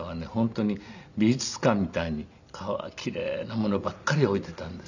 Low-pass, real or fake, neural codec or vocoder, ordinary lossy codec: 7.2 kHz; real; none; none